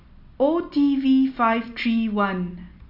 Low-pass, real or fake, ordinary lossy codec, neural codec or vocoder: 5.4 kHz; real; none; none